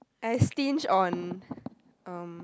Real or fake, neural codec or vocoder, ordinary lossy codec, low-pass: real; none; none; none